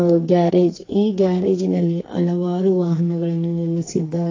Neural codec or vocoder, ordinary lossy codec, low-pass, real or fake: codec, 44.1 kHz, 2.6 kbps, SNAC; AAC, 32 kbps; 7.2 kHz; fake